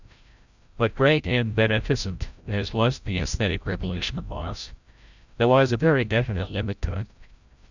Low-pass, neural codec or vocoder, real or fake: 7.2 kHz; codec, 16 kHz, 0.5 kbps, FreqCodec, larger model; fake